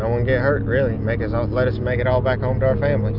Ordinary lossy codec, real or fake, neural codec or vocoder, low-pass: Opus, 64 kbps; real; none; 5.4 kHz